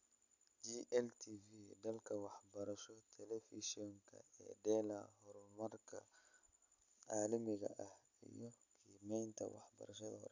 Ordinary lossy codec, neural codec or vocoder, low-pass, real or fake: none; none; 7.2 kHz; real